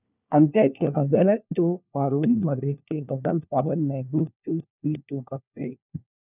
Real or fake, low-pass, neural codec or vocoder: fake; 3.6 kHz; codec, 16 kHz, 1 kbps, FunCodec, trained on LibriTTS, 50 frames a second